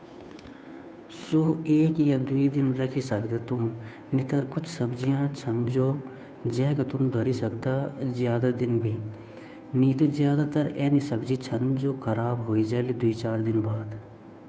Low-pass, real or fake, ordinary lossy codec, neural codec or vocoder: none; fake; none; codec, 16 kHz, 2 kbps, FunCodec, trained on Chinese and English, 25 frames a second